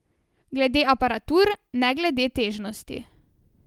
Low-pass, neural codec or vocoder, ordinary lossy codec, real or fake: 19.8 kHz; none; Opus, 24 kbps; real